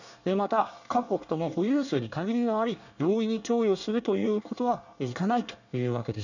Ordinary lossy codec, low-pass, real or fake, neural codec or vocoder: none; 7.2 kHz; fake; codec, 24 kHz, 1 kbps, SNAC